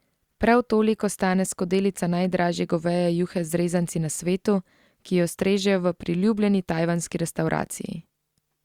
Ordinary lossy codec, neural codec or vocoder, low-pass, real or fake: Opus, 64 kbps; none; 19.8 kHz; real